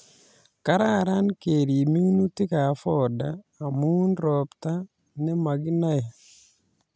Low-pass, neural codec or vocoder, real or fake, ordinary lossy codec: none; none; real; none